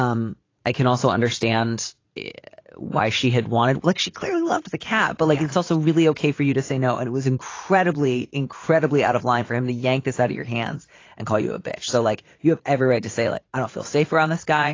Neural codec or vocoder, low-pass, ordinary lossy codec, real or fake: vocoder, 44.1 kHz, 80 mel bands, Vocos; 7.2 kHz; AAC, 32 kbps; fake